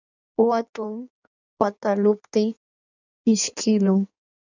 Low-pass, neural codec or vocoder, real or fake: 7.2 kHz; codec, 16 kHz in and 24 kHz out, 1.1 kbps, FireRedTTS-2 codec; fake